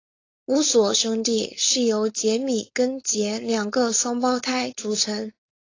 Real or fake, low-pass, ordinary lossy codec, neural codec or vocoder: fake; 7.2 kHz; AAC, 32 kbps; codec, 16 kHz, 4.8 kbps, FACodec